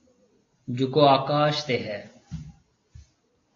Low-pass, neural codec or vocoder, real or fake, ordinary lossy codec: 7.2 kHz; none; real; AAC, 32 kbps